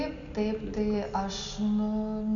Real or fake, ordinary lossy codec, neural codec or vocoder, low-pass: real; AAC, 64 kbps; none; 7.2 kHz